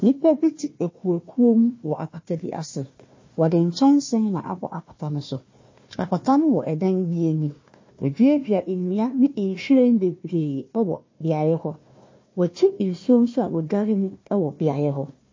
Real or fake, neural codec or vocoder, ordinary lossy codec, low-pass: fake; codec, 16 kHz, 1 kbps, FunCodec, trained on Chinese and English, 50 frames a second; MP3, 32 kbps; 7.2 kHz